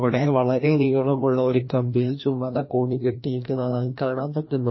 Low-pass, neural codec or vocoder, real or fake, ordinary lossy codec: 7.2 kHz; codec, 16 kHz, 1 kbps, FreqCodec, larger model; fake; MP3, 24 kbps